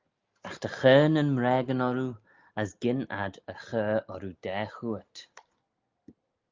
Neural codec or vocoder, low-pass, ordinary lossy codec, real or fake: none; 7.2 kHz; Opus, 32 kbps; real